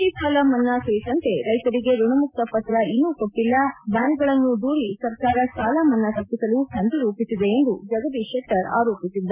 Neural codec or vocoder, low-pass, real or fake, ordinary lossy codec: none; 3.6 kHz; real; MP3, 32 kbps